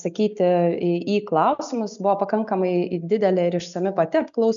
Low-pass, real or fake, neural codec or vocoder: 7.2 kHz; real; none